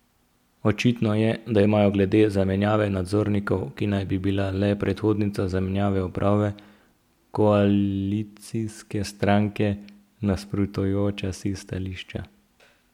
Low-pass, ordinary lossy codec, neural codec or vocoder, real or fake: 19.8 kHz; MP3, 96 kbps; none; real